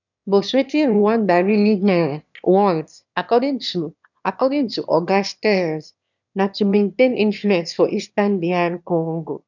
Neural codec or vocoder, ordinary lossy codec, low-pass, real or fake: autoencoder, 22.05 kHz, a latent of 192 numbers a frame, VITS, trained on one speaker; none; 7.2 kHz; fake